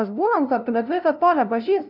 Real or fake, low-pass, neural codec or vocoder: fake; 5.4 kHz; codec, 16 kHz, 0.5 kbps, FunCodec, trained on LibriTTS, 25 frames a second